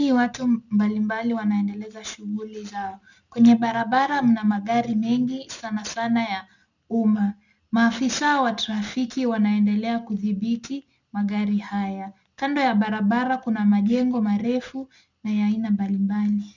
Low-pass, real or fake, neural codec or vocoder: 7.2 kHz; real; none